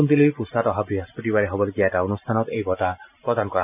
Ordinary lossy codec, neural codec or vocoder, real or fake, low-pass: none; none; real; 3.6 kHz